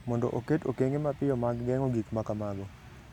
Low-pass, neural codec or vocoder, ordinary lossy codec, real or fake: 19.8 kHz; none; none; real